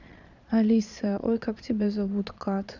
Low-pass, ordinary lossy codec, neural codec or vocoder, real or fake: 7.2 kHz; none; vocoder, 22.05 kHz, 80 mel bands, Vocos; fake